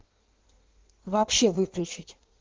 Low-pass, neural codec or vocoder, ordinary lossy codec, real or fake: 7.2 kHz; codec, 16 kHz in and 24 kHz out, 1.1 kbps, FireRedTTS-2 codec; Opus, 16 kbps; fake